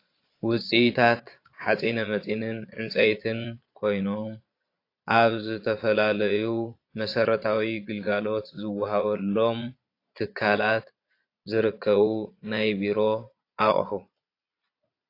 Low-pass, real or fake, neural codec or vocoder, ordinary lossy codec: 5.4 kHz; fake; vocoder, 22.05 kHz, 80 mel bands, Vocos; AAC, 32 kbps